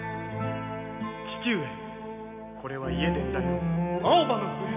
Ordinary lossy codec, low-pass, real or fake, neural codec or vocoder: AAC, 32 kbps; 3.6 kHz; real; none